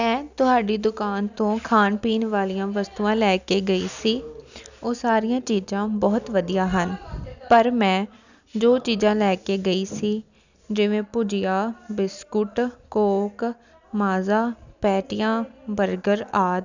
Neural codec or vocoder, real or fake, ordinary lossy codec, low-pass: none; real; none; 7.2 kHz